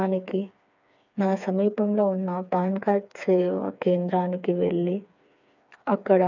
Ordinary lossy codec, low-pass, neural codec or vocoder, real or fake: none; 7.2 kHz; codec, 16 kHz, 4 kbps, FreqCodec, smaller model; fake